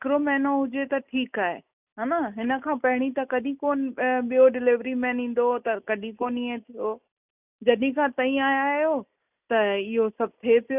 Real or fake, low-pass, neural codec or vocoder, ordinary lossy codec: real; 3.6 kHz; none; none